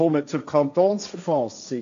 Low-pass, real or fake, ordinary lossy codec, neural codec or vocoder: 7.2 kHz; fake; AAC, 64 kbps; codec, 16 kHz, 1.1 kbps, Voila-Tokenizer